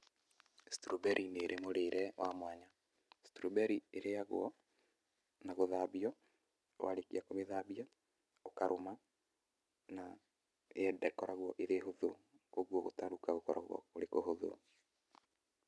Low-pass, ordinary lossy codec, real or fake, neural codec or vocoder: none; none; real; none